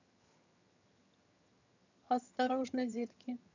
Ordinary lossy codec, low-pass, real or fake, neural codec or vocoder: none; 7.2 kHz; fake; vocoder, 22.05 kHz, 80 mel bands, HiFi-GAN